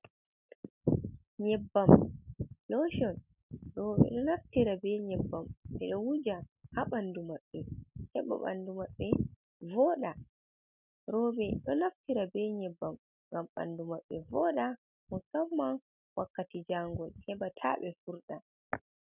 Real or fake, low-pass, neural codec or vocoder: real; 3.6 kHz; none